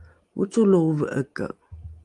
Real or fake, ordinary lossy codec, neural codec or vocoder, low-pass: real; Opus, 24 kbps; none; 10.8 kHz